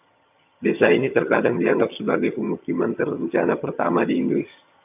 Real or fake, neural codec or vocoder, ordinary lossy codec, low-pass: fake; vocoder, 22.05 kHz, 80 mel bands, HiFi-GAN; AAC, 32 kbps; 3.6 kHz